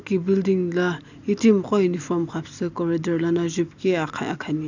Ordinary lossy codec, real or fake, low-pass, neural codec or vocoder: none; real; 7.2 kHz; none